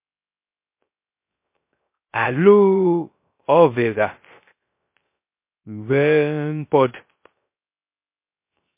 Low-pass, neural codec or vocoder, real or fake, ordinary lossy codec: 3.6 kHz; codec, 16 kHz, 0.3 kbps, FocalCodec; fake; MP3, 24 kbps